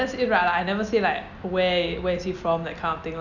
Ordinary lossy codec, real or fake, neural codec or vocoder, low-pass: none; real; none; 7.2 kHz